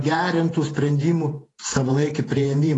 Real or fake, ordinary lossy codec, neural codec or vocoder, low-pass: fake; AAC, 32 kbps; vocoder, 48 kHz, 128 mel bands, Vocos; 10.8 kHz